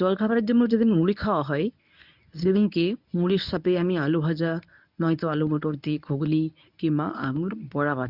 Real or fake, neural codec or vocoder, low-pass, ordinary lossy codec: fake; codec, 24 kHz, 0.9 kbps, WavTokenizer, medium speech release version 1; 5.4 kHz; none